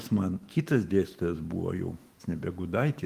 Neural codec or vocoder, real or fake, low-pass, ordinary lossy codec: autoencoder, 48 kHz, 128 numbers a frame, DAC-VAE, trained on Japanese speech; fake; 14.4 kHz; Opus, 24 kbps